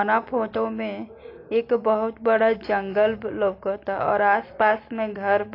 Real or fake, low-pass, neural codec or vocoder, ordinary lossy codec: real; 5.4 kHz; none; AAC, 32 kbps